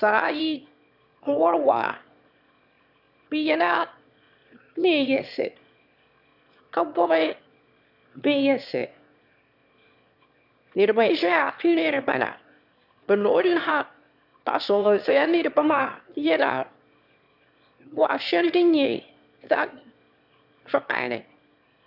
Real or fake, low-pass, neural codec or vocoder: fake; 5.4 kHz; autoencoder, 22.05 kHz, a latent of 192 numbers a frame, VITS, trained on one speaker